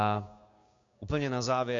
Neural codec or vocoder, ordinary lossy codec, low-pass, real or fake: codec, 16 kHz, 6 kbps, DAC; AAC, 48 kbps; 7.2 kHz; fake